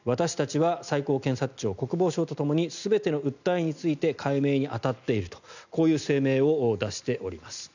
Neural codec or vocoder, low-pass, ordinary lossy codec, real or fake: none; 7.2 kHz; none; real